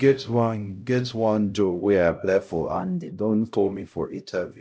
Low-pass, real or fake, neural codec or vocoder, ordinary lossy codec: none; fake; codec, 16 kHz, 0.5 kbps, X-Codec, HuBERT features, trained on LibriSpeech; none